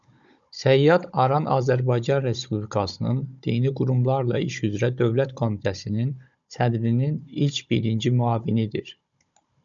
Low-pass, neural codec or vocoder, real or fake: 7.2 kHz; codec, 16 kHz, 16 kbps, FunCodec, trained on Chinese and English, 50 frames a second; fake